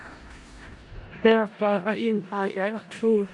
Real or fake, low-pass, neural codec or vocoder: fake; 10.8 kHz; codec, 16 kHz in and 24 kHz out, 0.4 kbps, LongCat-Audio-Codec, four codebook decoder